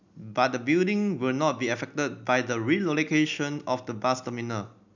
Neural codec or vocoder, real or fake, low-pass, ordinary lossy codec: none; real; 7.2 kHz; none